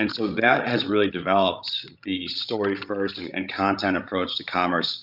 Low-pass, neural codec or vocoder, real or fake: 5.4 kHz; codec, 16 kHz, 16 kbps, FreqCodec, larger model; fake